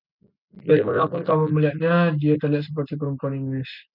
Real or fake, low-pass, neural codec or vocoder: real; 5.4 kHz; none